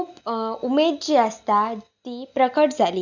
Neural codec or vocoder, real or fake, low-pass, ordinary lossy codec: none; real; 7.2 kHz; none